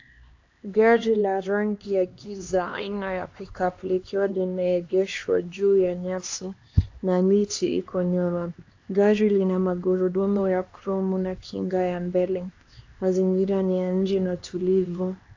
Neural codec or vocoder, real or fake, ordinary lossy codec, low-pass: codec, 16 kHz, 2 kbps, X-Codec, HuBERT features, trained on LibriSpeech; fake; AAC, 48 kbps; 7.2 kHz